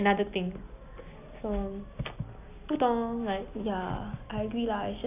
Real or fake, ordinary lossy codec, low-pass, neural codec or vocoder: real; none; 3.6 kHz; none